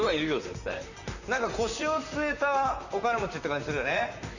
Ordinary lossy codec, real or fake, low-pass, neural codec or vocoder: none; fake; 7.2 kHz; vocoder, 44.1 kHz, 128 mel bands, Pupu-Vocoder